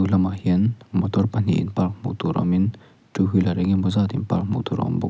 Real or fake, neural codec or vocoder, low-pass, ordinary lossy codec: real; none; none; none